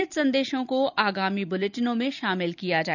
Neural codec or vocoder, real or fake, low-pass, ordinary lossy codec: none; real; 7.2 kHz; none